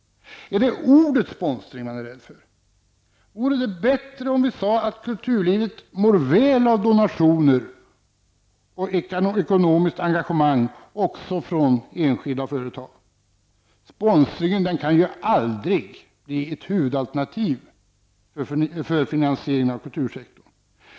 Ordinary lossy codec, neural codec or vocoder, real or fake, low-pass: none; none; real; none